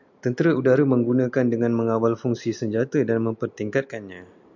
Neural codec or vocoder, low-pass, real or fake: none; 7.2 kHz; real